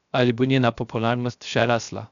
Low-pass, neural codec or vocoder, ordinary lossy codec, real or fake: 7.2 kHz; codec, 16 kHz, 0.3 kbps, FocalCodec; none; fake